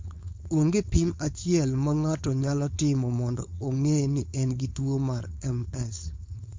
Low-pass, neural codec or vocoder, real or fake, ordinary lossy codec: 7.2 kHz; codec, 16 kHz, 4.8 kbps, FACodec; fake; MP3, 48 kbps